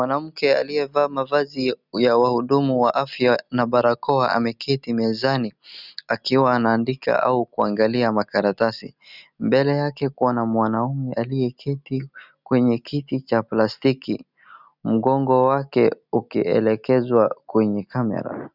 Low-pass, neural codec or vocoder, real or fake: 5.4 kHz; none; real